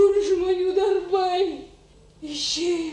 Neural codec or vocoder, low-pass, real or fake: none; 10.8 kHz; real